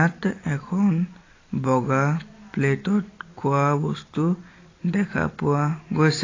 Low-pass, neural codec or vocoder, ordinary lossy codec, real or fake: 7.2 kHz; none; AAC, 32 kbps; real